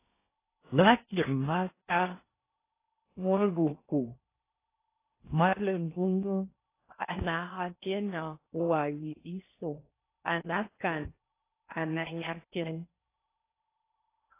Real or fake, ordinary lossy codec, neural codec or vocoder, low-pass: fake; AAC, 24 kbps; codec, 16 kHz in and 24 kHz out, 0.6 kbps, FocalCodec, streaming, 4096 codes; 3.6 kHz